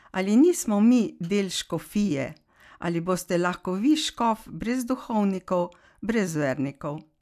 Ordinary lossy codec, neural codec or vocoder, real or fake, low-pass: none; none; real; 14.4 kHz